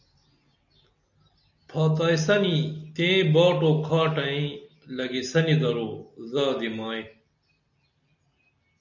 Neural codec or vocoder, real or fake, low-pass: none; real; 7.2 kHz